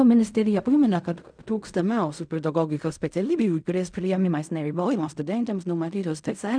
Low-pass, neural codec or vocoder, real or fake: 9.9 kHz; codec, 16 kHz in and 24 kHz out, 0.4 kbps, LongCat-Audio-Codec, fine tuned four codebook decoder; fake